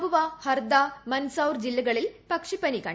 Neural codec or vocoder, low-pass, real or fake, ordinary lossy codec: none; none; real; none